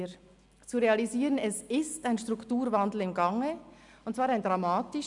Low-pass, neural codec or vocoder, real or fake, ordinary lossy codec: 10.8 kHz; none; real; none